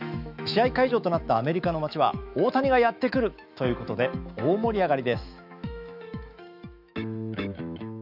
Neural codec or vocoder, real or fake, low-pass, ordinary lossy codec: autoencoder, 48 kHz, 128 numbers a frame, DAC-VAE, trained on Japanese speech; fake; 5.4 kHz; none